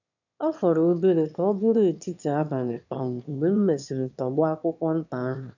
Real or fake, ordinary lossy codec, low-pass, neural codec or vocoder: fake; none; 7.2 kHz; autoencoder, 22.05 kHz, a latent of 192 numbers a frame, VITS, trained on one speaker